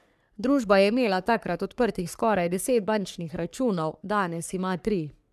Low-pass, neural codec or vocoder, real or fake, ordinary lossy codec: 14.4 kHz; codec, 44.1 kHz, 3.4 kbps, Pupu-Codec; fake; none